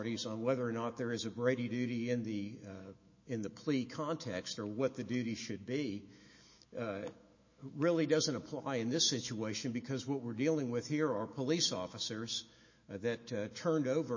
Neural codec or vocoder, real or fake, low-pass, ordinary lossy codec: autoencoder, 48 kHz, 128 numbers a frame, DAC-VAE, trained on Japanese speech; fake; 7.2 kHz; MP3, 32 kbps